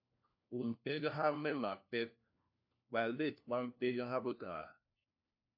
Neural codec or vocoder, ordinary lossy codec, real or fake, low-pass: codec, 16 kHz, 1 kbps, FunCodec, trained on LibriTTS, 50 frames a second; AAC, 48 kbps; fake; 5.4 kHz